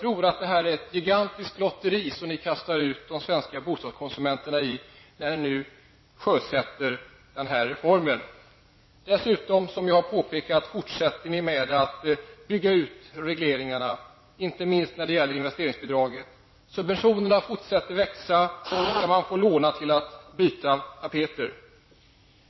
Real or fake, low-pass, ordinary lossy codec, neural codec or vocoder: fake; 7.2 kHz; MP3, 24 kbps; vocoder, 44.1 kHz, 80 mel bands, Vocos